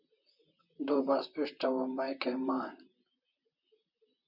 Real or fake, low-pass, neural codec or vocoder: fake; 5.4 kHz; vocoder, 44.1 kHz, 128 mel bands, Pupu-Vocoder